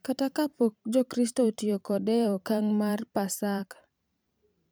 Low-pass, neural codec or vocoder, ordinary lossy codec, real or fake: none; vocoder, 44.1 kHz, 128 mel bands every 512 samples, BigVGAN v2; none; fake